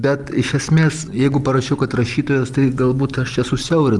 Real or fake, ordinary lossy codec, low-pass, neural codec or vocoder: real; Opus, 24 kbps; 10.8 kHz; none